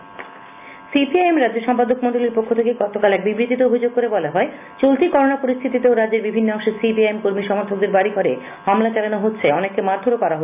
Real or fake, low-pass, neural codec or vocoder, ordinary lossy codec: real; 3.6 kHz; none; none